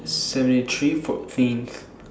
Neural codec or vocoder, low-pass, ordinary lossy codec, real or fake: none; none; none; real